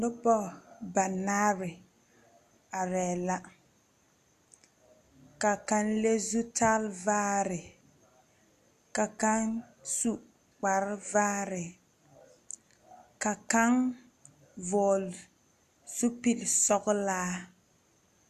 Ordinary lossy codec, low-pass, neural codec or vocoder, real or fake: AAC, 96 kbps; 14.4 kHz; none; real